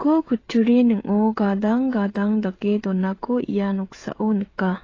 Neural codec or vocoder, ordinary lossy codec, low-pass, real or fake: codec, 16 kHz, 16 kbps, FreqCodec, smaller model; AAC, 32 kbps; 7.2 kHz; fake